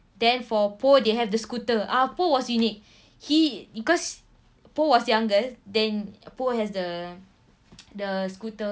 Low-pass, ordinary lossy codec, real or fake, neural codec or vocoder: none; none; real; none